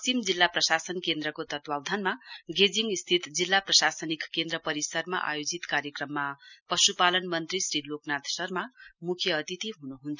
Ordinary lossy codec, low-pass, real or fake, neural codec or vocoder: none; 7.2 kHz; real; none